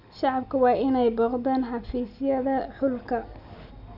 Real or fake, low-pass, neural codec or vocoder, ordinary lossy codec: real; 5.4 kHz; none; none